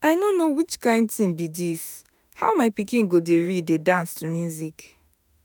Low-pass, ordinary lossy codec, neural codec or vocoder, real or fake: none; none; autoencoder, 48 kHz, 32 numbers a frame, DAC-VAE, trained on Japanese speech; fake